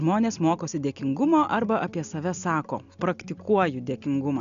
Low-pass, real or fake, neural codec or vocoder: 7.2 kHz; real; none